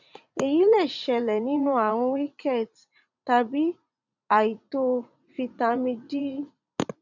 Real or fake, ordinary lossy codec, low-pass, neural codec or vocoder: fake; none; 7.2 kHz; vocoder, 44.1 kHz, 80 mel bands, Vocos